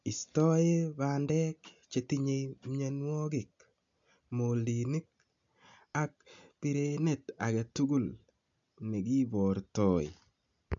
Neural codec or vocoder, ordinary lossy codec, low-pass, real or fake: none; AAC, 64 kbps; 7.2 kHz; real